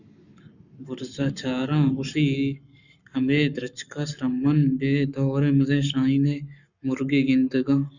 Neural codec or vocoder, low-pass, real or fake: codec, 44.1 kHz, 7.8 kbps, Pupu-Codec; 7.2 kHz; fake